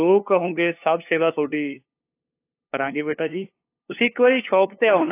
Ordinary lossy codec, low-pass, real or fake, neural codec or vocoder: AAC, 24 kbps; 3.6 kHz; fake; codec, 16 kHz, 2 kbps, FunCodec, trained on LibriTTS, 25 frames a second